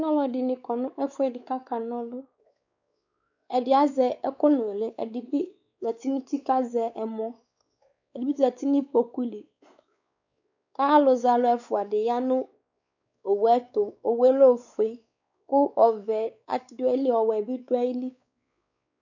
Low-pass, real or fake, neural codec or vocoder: 7.2 kHz; fake; codec, 16 kHz, 4 kbps, X-Codec, WavLM features, trained on Multilingual LibriSpeech